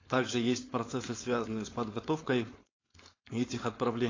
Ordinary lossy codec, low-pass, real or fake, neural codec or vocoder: AAC, 32 kbps; 7.2 kHz; fake; codec, 16 kHz, 4.8 kbps, FACodec